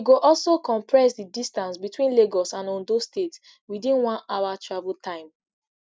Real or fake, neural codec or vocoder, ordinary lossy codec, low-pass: real; none; none; none